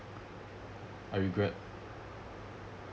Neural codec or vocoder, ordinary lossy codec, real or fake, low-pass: none; none; real; none